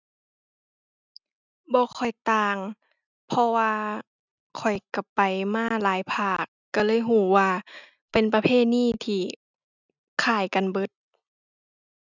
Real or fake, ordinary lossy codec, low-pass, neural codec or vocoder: real; none; 7.2 kHz; none